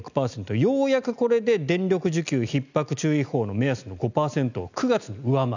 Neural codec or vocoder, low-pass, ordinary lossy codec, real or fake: none; 7.2 kHz; none; real